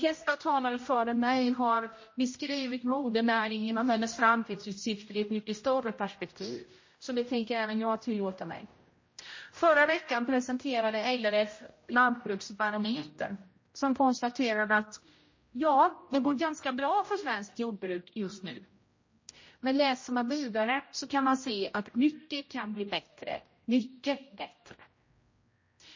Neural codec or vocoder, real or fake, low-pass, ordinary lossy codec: codec, 16 kHz, 0.5 kbps, X-Codec, HuBERT features, trained on general audio; fake; 7.2 kHz; MP3, 32 kbps